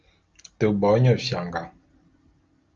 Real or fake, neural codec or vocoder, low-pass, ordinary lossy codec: real; none; 7.2 kHz; Opus, 24 kbps